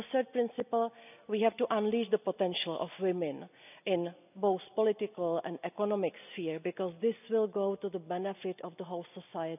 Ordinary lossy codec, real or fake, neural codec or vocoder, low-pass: none; real; none; 3.6 kHz